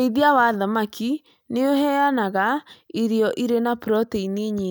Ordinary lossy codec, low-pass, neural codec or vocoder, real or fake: none; none; none; real